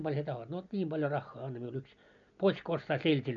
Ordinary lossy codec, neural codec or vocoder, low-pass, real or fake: none; none; 7.2 kHz; real